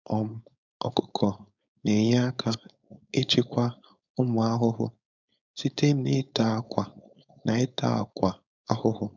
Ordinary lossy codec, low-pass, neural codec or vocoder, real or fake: none; 7.2 kHz; codec, 16 kHz, 4.8 kbps, FACodec; fake